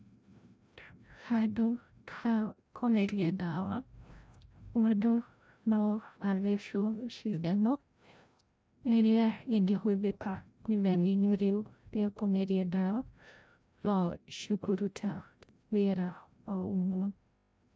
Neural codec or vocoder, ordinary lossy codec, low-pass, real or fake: codec, 16 kHz, 0.5 kbps, FreqCodec, larger model; none; none; fake